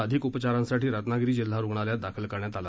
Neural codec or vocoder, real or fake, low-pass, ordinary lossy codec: none; real; none; none